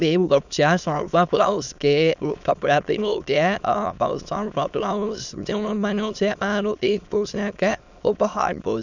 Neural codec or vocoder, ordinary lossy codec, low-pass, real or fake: autoencoder, 22.05 kHz, a latent of 192 numbers a frame, VITS, trained on many speakers; none; 7.2 kHz; fake